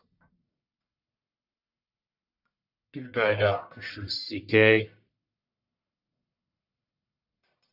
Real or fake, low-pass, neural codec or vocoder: fake; 5.4 kHz; codec, 44.1 kHz, 1.7 kbps, Pupu-Codec